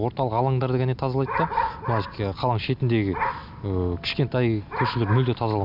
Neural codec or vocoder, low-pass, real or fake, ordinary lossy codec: none; 5.4 kHz; real; none